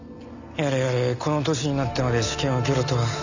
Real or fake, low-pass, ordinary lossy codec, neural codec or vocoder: real; 7.2 kHz; none; none